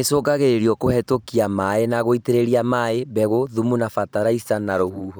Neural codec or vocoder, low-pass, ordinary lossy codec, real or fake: vocoder, 44.1 kHz, 128 mel bands every 512 samples, BigVGAN v2; none; none; fake